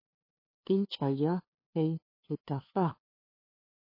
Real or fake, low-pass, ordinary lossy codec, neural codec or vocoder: fake; 5.4 kHz; MP3, 24 kbps; codec, 16 kHz, 2 kbps, FunCodec, trained on LibriTTS, 25 frames a second